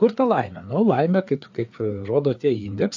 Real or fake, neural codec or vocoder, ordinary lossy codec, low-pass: fake; codec, 16 kHz, 4 kbps, FreqCodec, larger model; AAC, 48 kbps; 7.2 kHz